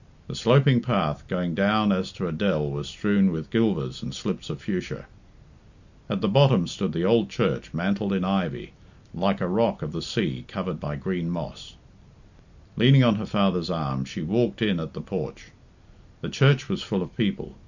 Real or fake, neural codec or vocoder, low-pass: real; none; 7.2 kHz